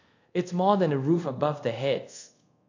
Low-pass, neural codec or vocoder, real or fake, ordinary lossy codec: 7.2 kHz; codec, 24 kHz, 0.5 kbps, DualCodec; fake; AAC, 48 kbps